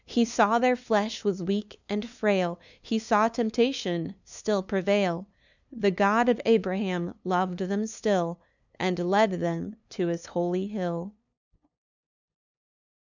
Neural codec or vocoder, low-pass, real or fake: codec, 16 kHz, 2 kbps, FunCodec, trained on LibriTTS, 25 frames a second; 7.2 kHz; fake